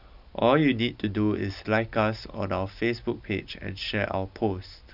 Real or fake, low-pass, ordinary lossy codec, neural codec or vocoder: real; 5.4 kHz; none; none